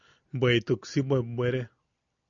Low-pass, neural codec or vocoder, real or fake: 7.2 kHz; none; real